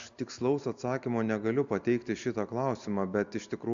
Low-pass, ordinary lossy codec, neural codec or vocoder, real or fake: 7.2 kHz; MP3, 64 kbps; none; real